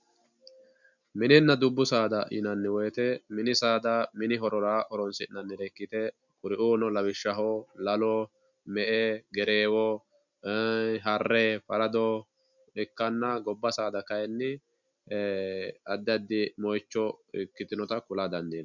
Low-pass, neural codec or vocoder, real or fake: 7.2 kHz; none; real